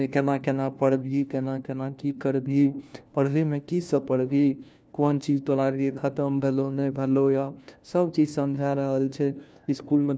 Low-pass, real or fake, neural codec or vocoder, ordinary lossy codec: none; fake; codec, 16 kHz, 1 kbps, FunCodec, trained on LibriTTS, 50 frames a second; none